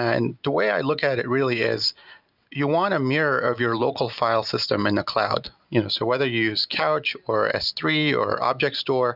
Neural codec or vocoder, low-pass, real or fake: none; 5.4 kHz; real